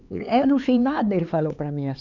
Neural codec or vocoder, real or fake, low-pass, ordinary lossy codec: codec, 16 kHz, 4 kbps, X-Codec, HuBERT features, trained on LibriSpeech; fake; 7.2 kHz; none